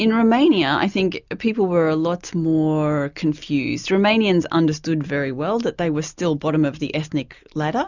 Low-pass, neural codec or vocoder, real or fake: 7.2 kHz; none; real